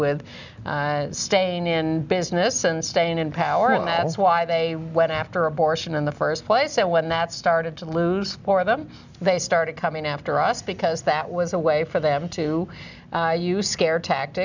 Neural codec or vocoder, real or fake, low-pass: none; real; 7.2 kHz